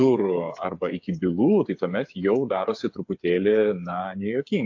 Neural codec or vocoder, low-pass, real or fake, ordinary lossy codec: codec, 16 kHz, 8 kbps, FreqCodec, smaller model; 7.2 kHz; fake; AAC, 48 kbps